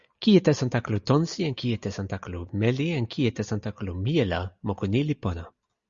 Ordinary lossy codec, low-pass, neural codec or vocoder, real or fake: Opus, 64 kbps; 7.2 kHz; none; real